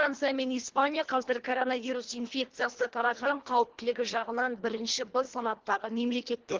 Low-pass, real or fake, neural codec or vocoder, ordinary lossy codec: 7.2 kHz; fake; codec, 24 kHz, 1.5 kbps, HILCodec; Opus, 16 kbps